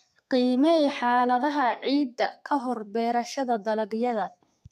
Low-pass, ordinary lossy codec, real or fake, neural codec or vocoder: 14.4 kHz; none; fake; codec, 32 kHz, 1.9 kbps, SNAC